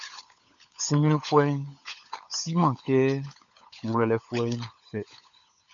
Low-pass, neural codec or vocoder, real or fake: 7.2 kHz; codec, 16 kHz, 16 kbps, FunCodec, trained on LibriTTS, 50 frames a second; fake